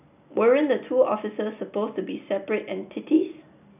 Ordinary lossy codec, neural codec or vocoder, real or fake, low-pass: none; none; real; 3.6 kHz